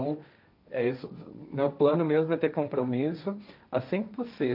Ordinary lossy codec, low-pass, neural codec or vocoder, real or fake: none; 5.4 kHz; codec, 16 kHz, 1.1 kbps, Voila-Tokenizer; fake